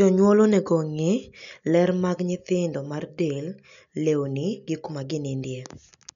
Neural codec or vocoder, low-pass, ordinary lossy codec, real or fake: none; 7.2 kHz; none; real